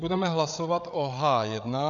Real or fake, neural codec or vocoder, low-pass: fake; codec, 16 kHz, 8 kbps, FreqCodec, larger model; 7.2 kHz